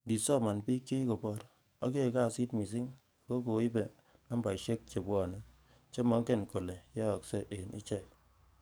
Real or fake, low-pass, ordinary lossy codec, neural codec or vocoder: fake; none; none; codec, 44.1 kHz, 7.8 kbps, DAC